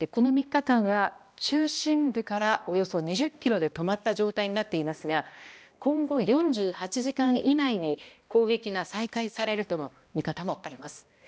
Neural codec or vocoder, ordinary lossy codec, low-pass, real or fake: codec, 16 kHz, 1 kbps, X-Codec, HuBERT features, trained on balanced general audio; none; none; fake